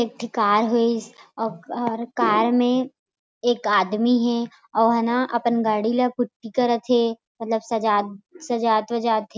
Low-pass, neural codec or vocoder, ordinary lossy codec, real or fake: none; none; none; real